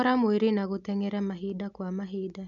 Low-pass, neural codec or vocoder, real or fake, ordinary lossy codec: 7.2 kHz; none; real; none